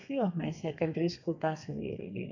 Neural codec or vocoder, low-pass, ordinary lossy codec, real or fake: codec, 32 kHz, 1.9 kbps, SNAC; 7.2 kHz; none; fake